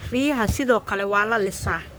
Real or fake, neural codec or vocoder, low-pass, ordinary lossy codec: fake; codec, 44.1 kHz, 3.4 kbps, Pupu-Codec; none; none